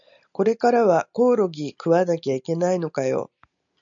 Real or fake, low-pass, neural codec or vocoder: real; 7.2 kHz; none